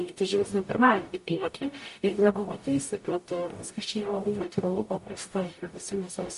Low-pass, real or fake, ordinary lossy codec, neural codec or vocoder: 14.4 kHz; fake; MP3, 48 kbps; codec, 44.1 kHz, 0.9 kbps, DAC